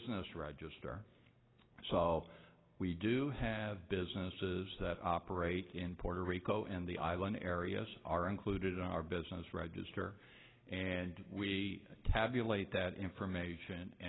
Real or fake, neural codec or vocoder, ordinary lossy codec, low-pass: real; none; AAC, 16 kbps; 7.2 kHz